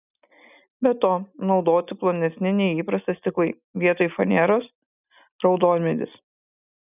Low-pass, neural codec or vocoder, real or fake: 3.6 kHz; none; real